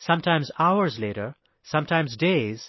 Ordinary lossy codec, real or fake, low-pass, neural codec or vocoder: MP3, 24 kbps; real; 7.2 kHz; none